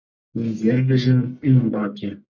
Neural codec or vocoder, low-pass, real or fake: codec, 44.1 kHz, 1.7 kbps, Pupu-Codec; 7.2 kHz; fake